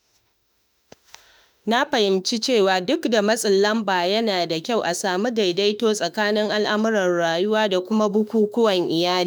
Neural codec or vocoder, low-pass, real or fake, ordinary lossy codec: autoencoder, 48 kHz, 32 numbers a frame, DAC-VAE, trained on Japanese speech; none; fake; none